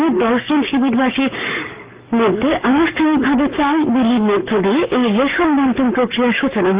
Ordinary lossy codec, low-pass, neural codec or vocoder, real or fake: Opus, 32 kbps; 3.6 kHz; codec, 44.1 kHz, 7.8 kbps, Pupu-Codec; fake